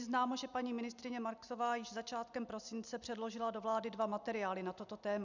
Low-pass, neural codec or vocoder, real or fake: 7.2 kHz; none; real